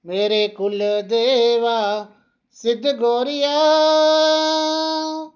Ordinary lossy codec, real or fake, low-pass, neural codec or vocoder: none; real; 7.2 kHz; none